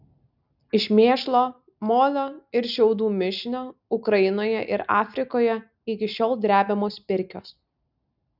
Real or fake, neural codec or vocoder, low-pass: real; none; 5.4 kHz